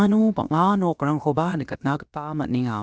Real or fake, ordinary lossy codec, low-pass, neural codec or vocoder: fake; none; none; codec, 16 kHz, about 1 kbps, DyCAST, with the encoder's durations